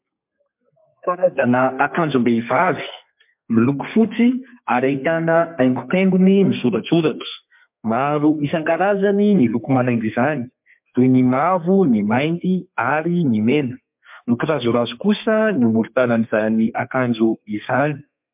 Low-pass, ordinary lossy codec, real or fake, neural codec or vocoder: 3.6 kHz; MP3, 32 kbps; fake; codec, 32 kHz, 1.9 kbps, SNAC